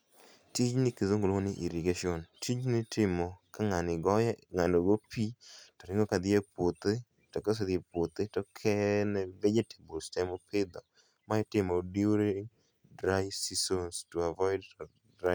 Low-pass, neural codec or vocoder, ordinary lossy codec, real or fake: none; none; none; real